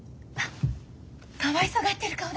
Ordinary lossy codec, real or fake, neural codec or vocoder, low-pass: none; real; none; none